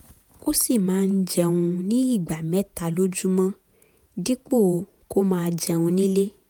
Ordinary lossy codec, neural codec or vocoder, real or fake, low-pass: none; vocoder, 48 kHz, 128 mel bands, Vocos; fake; none